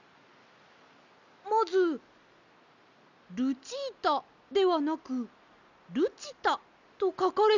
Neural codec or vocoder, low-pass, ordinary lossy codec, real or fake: none; 7.2 kHz; none; real